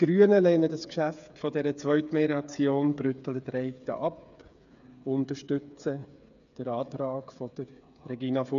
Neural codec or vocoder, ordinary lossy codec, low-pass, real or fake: codec, 16 kHz, 8 kbps, FreqCodec, smaller model; none; 7.2 kHz; fake